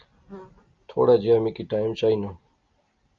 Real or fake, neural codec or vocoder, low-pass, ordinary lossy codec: real; none; 7.2 kHz; Opus, 24 kbps